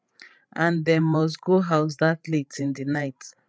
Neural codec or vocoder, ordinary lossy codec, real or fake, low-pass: codec, 16 kHz, 8 kbps, FreqCodec, larger model; none; fake; none